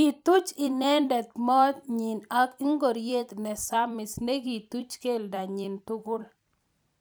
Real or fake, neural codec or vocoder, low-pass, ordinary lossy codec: fake; vocoder, 44.1 kHz, 128 mel bands every 256 samples, BigVGAN v2; none; none